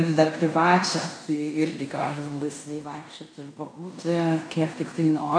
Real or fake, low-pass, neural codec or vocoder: fake; 9.9 kHz; codec, 16 kHz in and 24 kHz out, 0.9 kbps, LongCat-Audio-Codec, fine tuned four codebook decoder